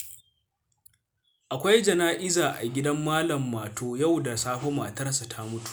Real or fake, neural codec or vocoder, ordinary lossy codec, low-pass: real; none; none; none